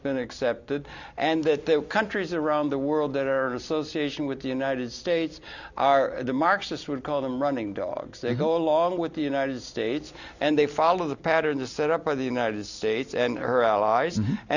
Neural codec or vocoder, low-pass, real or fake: none; 7.2 kHz; real